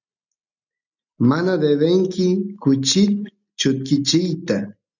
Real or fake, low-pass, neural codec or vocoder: real; 7.2 kHz; none